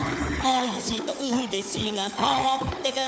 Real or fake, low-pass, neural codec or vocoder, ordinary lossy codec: fake; none; codec, 16 kHz, 4 kbps, FunCodec, trained on Chinese and English, 50 frames a second; none